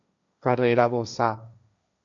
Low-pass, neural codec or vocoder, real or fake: 7.2 kHz; codec, 16 kHz, 1.1 kbps, Voila-Tokenizer; fake